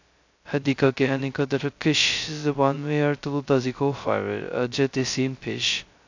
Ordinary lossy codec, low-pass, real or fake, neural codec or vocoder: AAC, 48 kbps; 7.2 kHz; fake; codec, 16 kHz, 0.2 kbps, FocalCodec